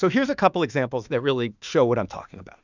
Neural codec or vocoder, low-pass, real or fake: autoencoder, 48 kHz, 32 numbers a frame, DAC-VAE, trained on Japanese speech; 7.2 kHz; fake